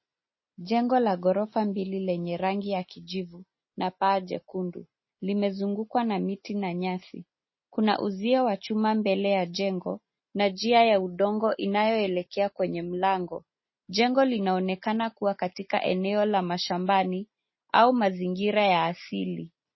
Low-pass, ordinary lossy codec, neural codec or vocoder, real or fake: 7.2 kHz; MP3, 24 kbps; none; real